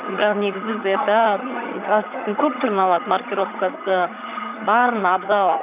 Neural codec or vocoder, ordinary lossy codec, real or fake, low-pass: vocoder, 22.05 kHz, 80 mel bands, HiFi-GAN; none; fake; 3.6 kHz